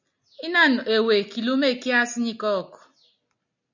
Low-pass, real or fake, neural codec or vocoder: 7.2 kHz; real; none